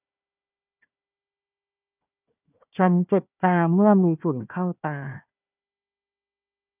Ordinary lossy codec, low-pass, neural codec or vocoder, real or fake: none; 3.6 kHz; codec, 16 kHz, 1 kbps, FunCodec, trained on Chinese and English, 50 frames a second; fake